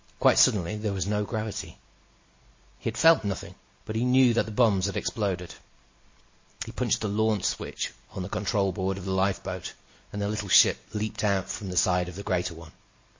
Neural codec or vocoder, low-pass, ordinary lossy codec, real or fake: none; 7.2 kHz; MP3, 32 kbps; real